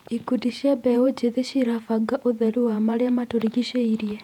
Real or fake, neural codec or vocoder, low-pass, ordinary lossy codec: fake; vocoder, 48 kHz, 128 mel bands, Vocos; 19.8 kHz; none